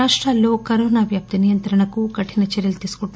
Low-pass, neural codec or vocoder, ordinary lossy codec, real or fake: none; none; none; real